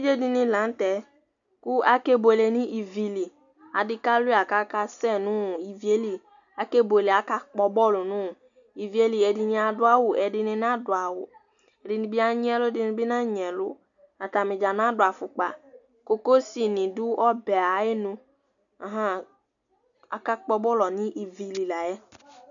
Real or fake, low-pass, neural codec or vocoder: real; 7.2 kHz; none